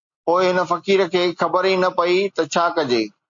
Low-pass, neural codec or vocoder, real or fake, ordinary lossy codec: 7.2 kHz; none; real; MP3, 48 kbps